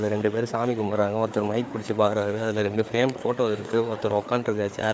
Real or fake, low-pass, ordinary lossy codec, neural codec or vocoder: fake; none; none; codec, 16 kHz, 4 kbps, FreqCodec, larger model